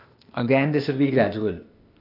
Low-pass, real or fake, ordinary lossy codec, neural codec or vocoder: 5.4 kHz; fake; none; codec, 16 kHz, 0.8 kbps, ZipCodec